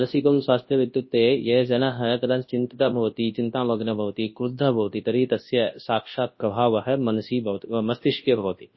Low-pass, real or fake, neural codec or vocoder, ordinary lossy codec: 7.2 kHz; fake; codec, 24 kHz, 0.9 kbps, WavTokenizer, large speech release; MP3, 24 kbps